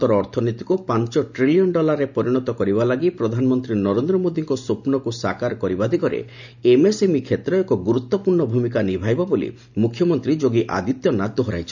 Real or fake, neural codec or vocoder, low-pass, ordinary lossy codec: real; none; 7.2 kHz; none